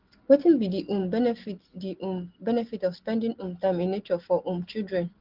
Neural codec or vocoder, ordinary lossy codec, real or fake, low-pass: none; Opus, 16 kbps; real; 5.4 kHz